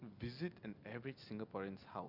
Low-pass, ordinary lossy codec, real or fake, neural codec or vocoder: 5.4 kHz; none; real; none